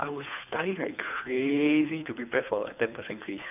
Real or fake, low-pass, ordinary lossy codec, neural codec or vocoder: fake; 3.6 kHz; none; codec, 24 kHz, 3 kbps, HILCodec